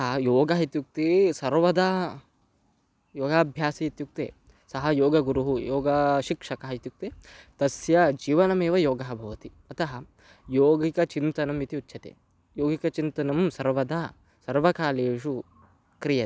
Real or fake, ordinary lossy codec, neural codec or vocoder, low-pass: real; none; none; none